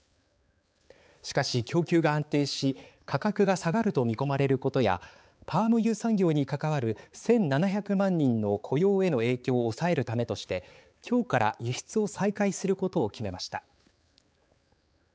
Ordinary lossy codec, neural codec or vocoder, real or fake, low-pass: none; codec, 16 kHz, 4 kbps, X-Codec, HuBERT features, trained on balanced general audio; fake; none